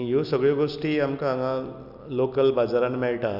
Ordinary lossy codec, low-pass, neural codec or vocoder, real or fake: none; 5.4 kHz; none; real